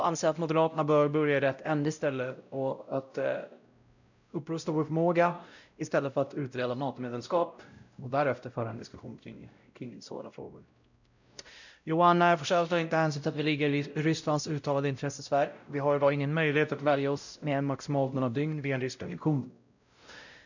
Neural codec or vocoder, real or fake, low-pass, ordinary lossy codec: codec, 16 kHz, 0.5 kbps, X-Codec, WavLM features, trained on Multilingual LibriSpeech; fake; 7.2 kHz; none